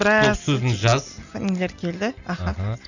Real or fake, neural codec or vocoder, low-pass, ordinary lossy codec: real; none; 7.2 kHz; none